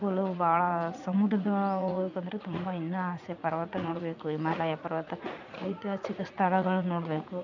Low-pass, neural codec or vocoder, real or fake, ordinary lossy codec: 7.2 kHz; vocoder, 44.1 kHz, 80 mel bands, Vocos; fake; none